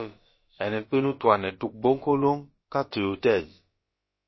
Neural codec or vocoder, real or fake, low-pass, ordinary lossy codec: codec, 16 kHz, about 1 kbps, DyCAST, with the encoder's durations; fake; 7.2 kHz; MP3, 24 kbps